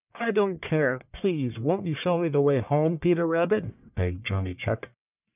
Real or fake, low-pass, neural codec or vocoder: fake; 3.6 kHz; codec, 44.1 kHz, 1.7 kbps, Pupu-Codec